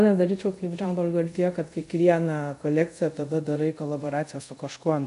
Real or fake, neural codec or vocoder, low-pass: fake; codec, 24 kHz, 0.5 kbps, DualCodec; 10.8 kHz